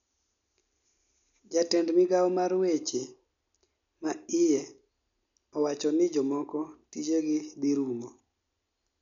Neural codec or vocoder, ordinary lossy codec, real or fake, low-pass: none; none; real; 7.2 kHz